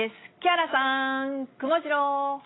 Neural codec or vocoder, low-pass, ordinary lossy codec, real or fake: none; 7.2 kHz; AAC, 16 kbps; real